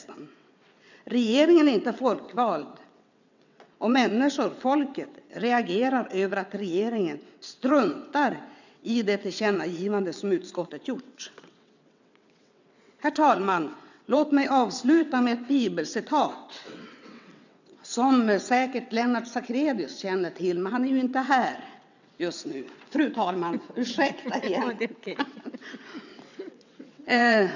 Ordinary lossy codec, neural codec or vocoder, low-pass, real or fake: none; vocoder, 22.05 kHz, 80 mel bands, WaveNeXt; 7.2 kHz; fake